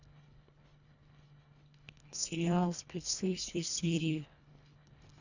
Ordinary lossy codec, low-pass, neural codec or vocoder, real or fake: none; 7.2 kHz; codec, 24 kHz, 1.5 kbps, HILCodec; fake